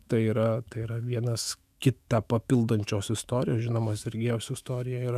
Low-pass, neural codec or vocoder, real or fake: 14.4 kHz; autoencoder, 48 kHz, 128 numbers a frame, DAC-VAE, trained on Japanese speech; fake